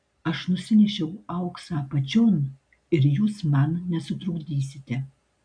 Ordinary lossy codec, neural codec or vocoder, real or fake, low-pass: AAC, 64 kbps; none; real; 9.9 kHz